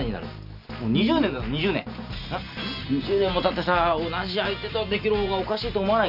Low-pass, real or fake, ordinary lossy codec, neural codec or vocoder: 5.4 kHz; real; none; none